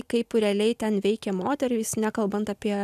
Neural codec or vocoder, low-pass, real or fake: none; 14.4 kHz; real